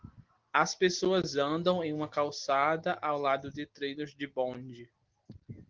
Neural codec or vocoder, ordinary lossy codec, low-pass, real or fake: none; Opus, 16 kbps; 7.2 kHz; real